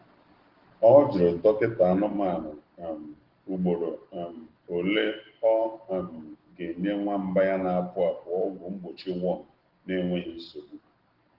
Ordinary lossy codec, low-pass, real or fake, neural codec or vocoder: Opus, 16 kbps; 5.4 kHz; real; none